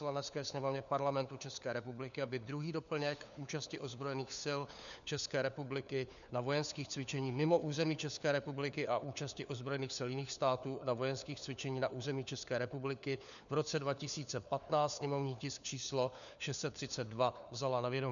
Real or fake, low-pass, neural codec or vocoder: fake; 7.2 kHz; codec, 16 kHz, 4 kbps, FunCodec, trained on LibriTTS, 50 frames a second